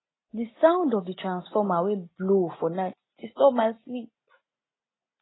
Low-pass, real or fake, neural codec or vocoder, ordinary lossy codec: 7.2 kHz; real; none; AAC, 16 kbps